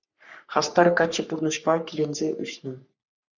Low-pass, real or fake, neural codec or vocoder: 7.2 kHz; fake; codec, 44.1 kHz, 3.4 kbps, Pupu-Codec